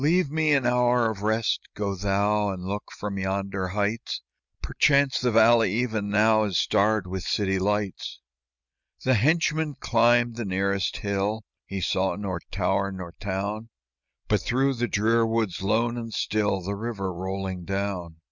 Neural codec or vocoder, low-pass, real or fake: none; 7.2 kHz; real